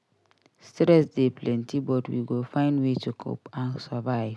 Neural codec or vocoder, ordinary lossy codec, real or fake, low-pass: none; none; real; none